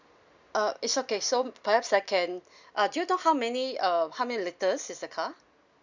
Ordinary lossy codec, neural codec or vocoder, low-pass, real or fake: none; none; 7.2 kHz; real